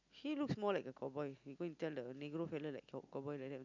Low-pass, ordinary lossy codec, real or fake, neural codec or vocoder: 7.2 kHz; none; real; none